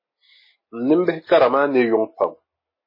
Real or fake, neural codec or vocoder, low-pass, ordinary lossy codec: real; none; 5.4 kHz; MP3, 24 kbps